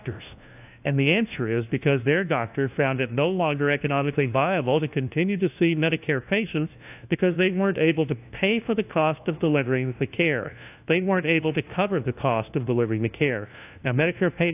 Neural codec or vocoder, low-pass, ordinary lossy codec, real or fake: codec, 16 kHz, 1 kbps, FunCodec, trained on LibriTTS, 50 frames a second; 3.6 kHz; AAC, 32 kbps; fake